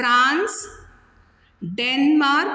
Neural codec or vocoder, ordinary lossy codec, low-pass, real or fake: none; none; none; real